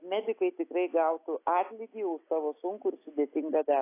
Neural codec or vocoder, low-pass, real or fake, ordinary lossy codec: none; 3.6 kHz; real; AAC, 24 kbps